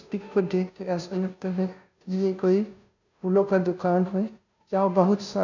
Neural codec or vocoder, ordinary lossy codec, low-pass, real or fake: codec, 16 kHz, 0.5 kbps, FunCodec, trained on Chinese and English, 25 frames a second; none; 7.2 kHz; fake